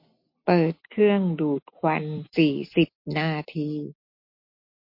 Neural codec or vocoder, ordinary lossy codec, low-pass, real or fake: none; MP3, 32 kbps; 5.4 kHz; real